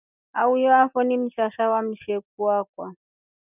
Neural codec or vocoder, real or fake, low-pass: none; real; 3.6 kHz